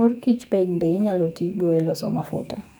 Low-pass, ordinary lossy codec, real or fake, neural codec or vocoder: none; none; fake; codec, 44.1 kHz, 2.6 kbps, SNAC